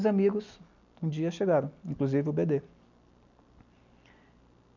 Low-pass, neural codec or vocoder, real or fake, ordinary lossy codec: 7.2 kHz; none; real; none